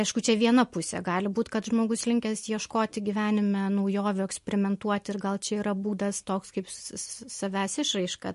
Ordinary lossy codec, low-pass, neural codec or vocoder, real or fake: MP3, 48 kbps; 14.4 kHz; none; real